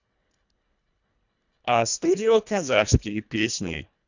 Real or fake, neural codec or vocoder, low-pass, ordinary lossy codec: fake; codec, 24 kHz, 1.5 kbps, HILCodec; 7.2 kHz; none